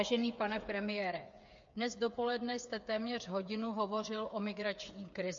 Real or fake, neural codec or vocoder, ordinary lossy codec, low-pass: fake; codec, 16 kHz, 8 kbps, FreqCodec, smaller model; MP3, 48 kbps; 7.2 kHz